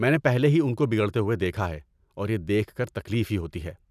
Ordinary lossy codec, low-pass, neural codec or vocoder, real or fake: none; 14.4 kHz; none; real